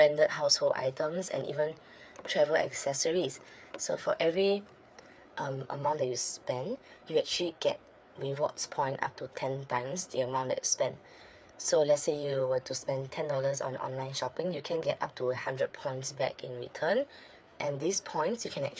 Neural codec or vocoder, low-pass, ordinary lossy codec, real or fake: codec, 16 kHz, 4 kbps, FreqCodec, larger model; none; none; fake